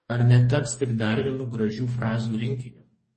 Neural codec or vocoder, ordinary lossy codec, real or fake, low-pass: codec, 44.1 kHz, 2.6 kbps, DAC; MP3, 32 kbps; fake; 10.8 kHz